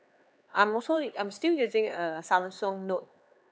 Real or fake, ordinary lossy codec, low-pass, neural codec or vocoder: fake; none; none; codec, 16 kHz, 4 kbps, X-Codec, HuBERT features, trained on LibriSpeech